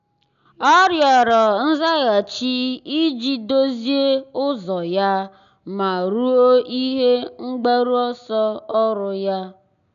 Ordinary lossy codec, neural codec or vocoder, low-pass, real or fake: none; none; 7.2 kHz; real